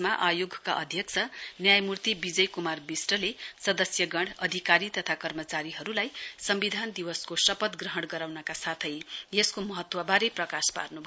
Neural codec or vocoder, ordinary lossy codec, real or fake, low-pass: none; none; real; none